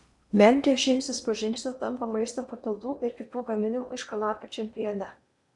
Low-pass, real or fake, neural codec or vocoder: 10.8 kHz; fake; codec, 16 kHz in and 24 kHz out, 0.8 kbps, FocalCodec, streaming, 65536 codes